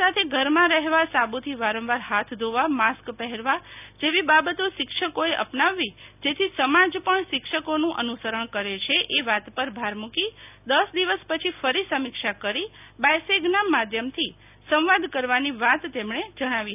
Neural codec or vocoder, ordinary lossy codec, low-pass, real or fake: none; none; 3.6 kHz; real